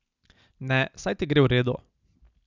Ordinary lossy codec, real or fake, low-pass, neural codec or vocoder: none; real; 7.2 kHz; none